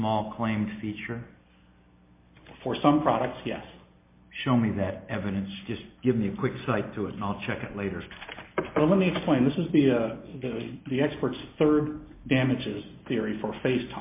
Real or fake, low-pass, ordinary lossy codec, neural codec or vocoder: real; 3.6 kHz; MP3, 24 kbps; none